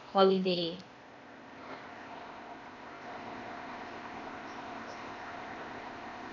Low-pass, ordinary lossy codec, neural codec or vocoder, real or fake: 7.2 kHz; none; codec, 16 kHz, 0.8 kbps, ZipCodec; fake